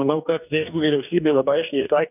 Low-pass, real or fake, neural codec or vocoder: 3.6 kHz; fake; codec, 44.1 kHz, 2.6 kbps, DAC